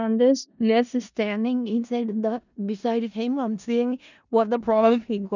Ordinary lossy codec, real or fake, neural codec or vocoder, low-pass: none; fake; codec, 16 kHz in and 24 kHz out, 0.4 kbps, LongCat-Audio-Codec, four codebook decoder; 7.2 kHz